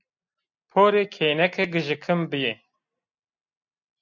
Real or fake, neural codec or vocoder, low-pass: real; none; 7.2 kHz